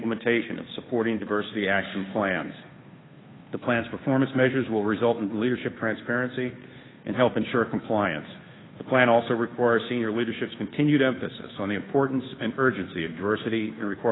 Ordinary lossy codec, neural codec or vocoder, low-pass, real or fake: AAC, 16 kbps; codec, 16 kHz, 2 kbps, FunCodec, trained on Chinese and English, 25 frames a second; 7.2 kHz; fake